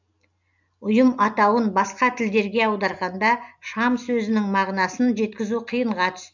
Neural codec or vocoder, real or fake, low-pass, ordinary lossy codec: none; real; 7.2 kHz; none